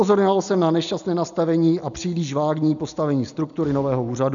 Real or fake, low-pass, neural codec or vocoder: real; 7.2 kHz; none